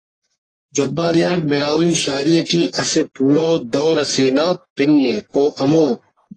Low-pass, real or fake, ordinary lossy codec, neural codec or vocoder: 9.9 kHz; fake; AAC, 32 kbps; codec, 44.1 kHz, 1.7 kbps, Pupu-Codec